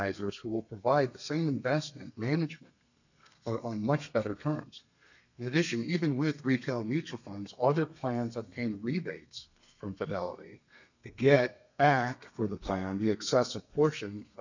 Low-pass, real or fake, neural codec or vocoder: 7.2 kHz; fake; codec, 32 kHz, 1.9 kbps, SNAC